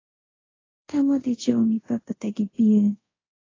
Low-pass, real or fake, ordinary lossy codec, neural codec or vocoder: 7.2 kHz; fake; AAC, 32 kbps; codec, 24 kHz, 0.5 kbps, DualCodec